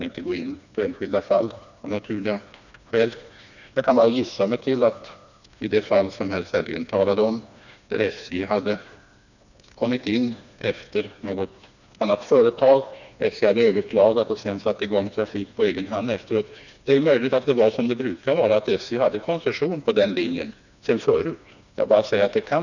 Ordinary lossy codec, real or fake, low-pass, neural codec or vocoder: none; fake; 7.2 kHz; codec, 16 kHz, 2 kbps, FreqCodec, smaller model